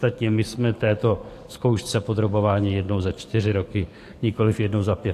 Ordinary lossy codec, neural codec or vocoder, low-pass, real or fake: AAC, 64 kbps; codec, 44.1 kHz, 7.8 kbps, DAC; 14.4 kHz; fake